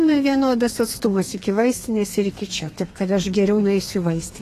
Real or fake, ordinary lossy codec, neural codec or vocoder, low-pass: fake; AAC, 48 kbps; codec, 32 kHz, 1.9 kbps, SNAC; 14.4 kHz